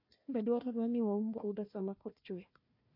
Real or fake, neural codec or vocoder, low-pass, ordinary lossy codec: fake; codec, 16 kHz, 1 kbps, FunCodec, trained on Chinese and English, 50 frames a second; 5.4 kHz; MP3, 32 kbps